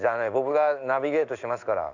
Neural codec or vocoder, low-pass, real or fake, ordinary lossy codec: codec, 16 kHz in and 24 kHz out, 1 kbps, XY-Tokenizer; 7.2 kHz; fake; Opus, 64 kbps